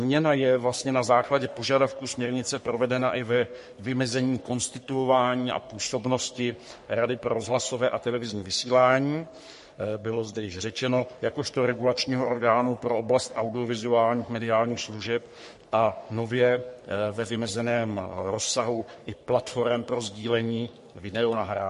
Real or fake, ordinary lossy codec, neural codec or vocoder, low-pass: fake; MP3, 48 kbps; codec, 44.1 kHz, 3.4 kbps, Pupu-Codec; 14.4 kHz